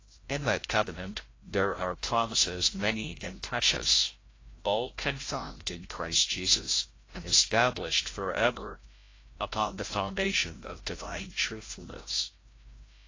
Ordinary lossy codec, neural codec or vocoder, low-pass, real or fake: AAC, 32 kbps; codec, 16 kHz, 0.5 kbps, FreqCodec, larger model; 7.2 kHz; fake